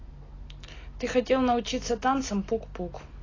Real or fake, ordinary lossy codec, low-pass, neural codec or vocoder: real; AAC, 32 kbps; 7.2 kHz; none